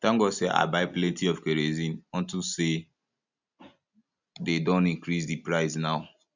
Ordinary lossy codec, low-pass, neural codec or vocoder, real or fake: none; 7.2 kHz; none; real